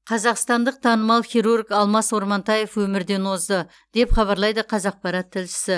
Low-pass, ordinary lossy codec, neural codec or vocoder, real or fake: none; none; none; real